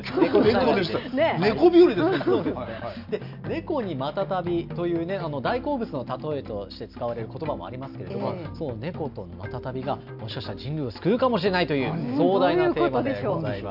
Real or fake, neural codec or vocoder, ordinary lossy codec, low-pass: real; none; none; 5.4 kHz